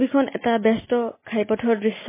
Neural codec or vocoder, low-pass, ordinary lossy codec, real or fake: none; 3.6 kHz; MP3, 16 kbps; real